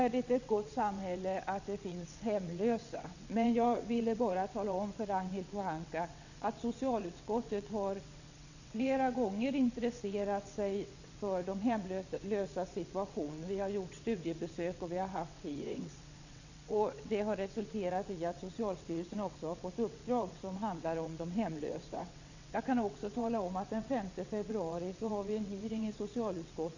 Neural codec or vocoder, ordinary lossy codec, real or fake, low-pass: vocoder, 22.05 kHz, 80 mel bands, WaveNeXt; none; fake; 7.2 kHz